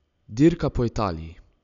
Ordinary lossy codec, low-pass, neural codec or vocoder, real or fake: none; 7.2 kHz; none; real